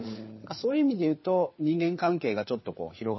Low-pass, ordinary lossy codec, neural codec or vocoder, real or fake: 7.2 kHz; MP3, 24 kbps; codec, 16 kHz, 4 kbps, FunCodec, trained on LibriTTS, 50 frames a second; fake